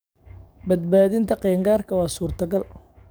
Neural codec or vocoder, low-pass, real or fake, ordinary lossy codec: codec, 44.1 kHz, 7.8 kbps, DAC; none; fake; none